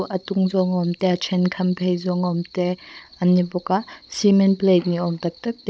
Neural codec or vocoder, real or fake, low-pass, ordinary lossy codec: codec, 16 kHz, 16 kbps, FunCodec, trained on Chinese and English, 50 frames a second; fake; none; none